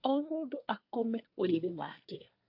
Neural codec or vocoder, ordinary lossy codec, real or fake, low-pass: codec, 24 kHz, 1 kbps, SNAC; AAC, 32 kbps; fake; 5.4 kHz